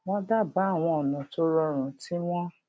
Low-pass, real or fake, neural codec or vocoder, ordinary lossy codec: none; real; none; none